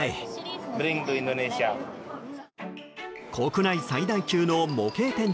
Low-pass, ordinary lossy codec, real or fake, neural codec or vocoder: none; none; real; none